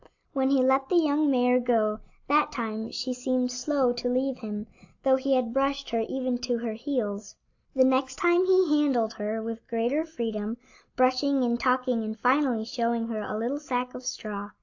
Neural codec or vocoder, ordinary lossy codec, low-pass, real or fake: none; AAC, 48 kbps; 7.2 kHz; real